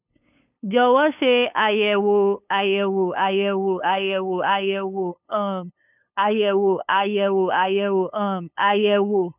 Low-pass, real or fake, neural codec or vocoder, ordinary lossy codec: 3.6 kHz; fake; codec, 16 kHz, 8 kbps, FunCodec, trained on LibriTTS, 25 frames a second; none